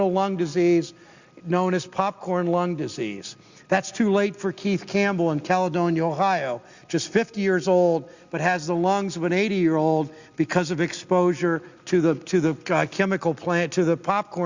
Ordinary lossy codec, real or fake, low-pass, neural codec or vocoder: Opus, 64 kbps; real; 7.2 kHz; none